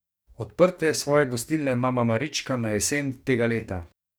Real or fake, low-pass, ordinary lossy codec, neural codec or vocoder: fake; none; none; codec, 44.1 kHz, 2.6 kbps, DAC